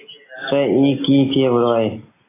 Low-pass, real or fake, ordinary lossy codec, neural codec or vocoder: 3.6 kHz; real; AAC, 16 kbps; none